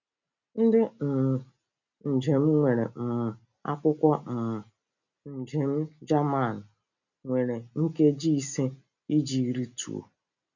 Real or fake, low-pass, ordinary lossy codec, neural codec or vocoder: real; 7.2 kHz; none; none